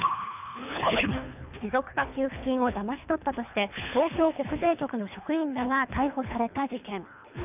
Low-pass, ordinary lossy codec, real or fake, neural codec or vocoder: 3.6 kHz; none; fake; codec, 24 kHz, 3 kbps, HILCodec